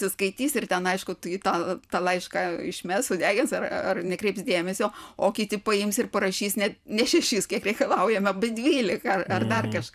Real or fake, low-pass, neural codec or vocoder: fake; 14.4 kHz; vocoder, 44.1 kHz, 128 mel bands every 512 samples, BigVGAN v2